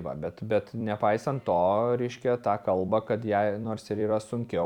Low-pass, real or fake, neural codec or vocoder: 19.8 kHz; fake; vocoder, 44.1 kHz, 128 mel bands every 256 samples, BigVGAN v2